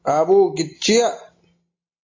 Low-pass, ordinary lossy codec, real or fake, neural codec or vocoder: 7.2 kHz; AAC, 32 kbps; real; none